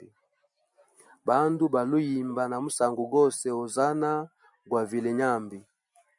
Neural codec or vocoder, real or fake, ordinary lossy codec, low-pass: none; real; MP3, 96 kbps; 10.8 kHz